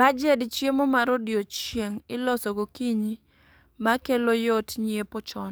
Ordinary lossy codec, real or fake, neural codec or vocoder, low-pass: none; fake; codec, 44.1 kHz, 7.8 kbps, DAC; none